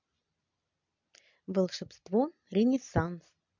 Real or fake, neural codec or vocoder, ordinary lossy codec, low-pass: real; none; MP3, 64 kbps; 7.2 kHz